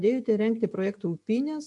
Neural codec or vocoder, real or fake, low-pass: none; real; 10.8 kHz